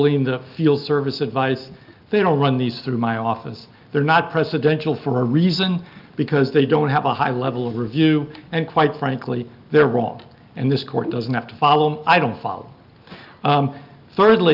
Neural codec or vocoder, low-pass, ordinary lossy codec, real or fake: none; 5.4 kHz; Opus, 24 kbps; real